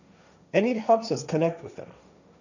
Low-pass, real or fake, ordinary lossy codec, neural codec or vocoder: 7.2 kHz; fake; none; codec, 16 kHz, 1.1 kbps, Voila-Tokenizer